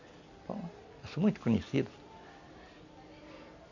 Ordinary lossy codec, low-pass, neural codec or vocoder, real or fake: none; 7.2 kHz; none; real